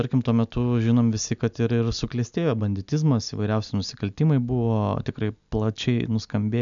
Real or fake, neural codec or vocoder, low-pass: real; none; 7.2 kHz